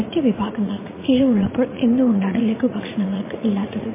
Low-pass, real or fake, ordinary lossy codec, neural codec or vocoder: 3.6 kHz; fake; MP3, 16 kbps; vocoder, 44.1 kHz, 80 mel bands, Vocos